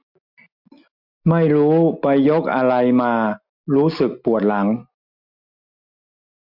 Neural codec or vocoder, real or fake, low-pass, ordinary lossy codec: none; real; 5.4 kHz; MP3, 48 kbps